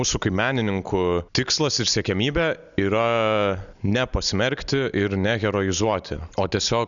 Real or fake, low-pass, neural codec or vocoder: real; 7.2 kHz; none